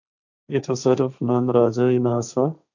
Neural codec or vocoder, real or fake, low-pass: codec, 16 kHz, 1.1 kbps, Voila-Tokenizer; fake; 7.2 kHz